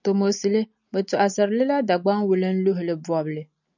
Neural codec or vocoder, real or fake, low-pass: none; real; 7.2 kHz